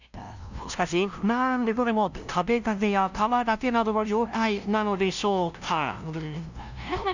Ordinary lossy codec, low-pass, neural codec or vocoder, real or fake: none; 7.2 kHz; codec, 16 kHz, 0.5 kbps, FunCodec, trained on LibriTTS, 25 frames a second; fake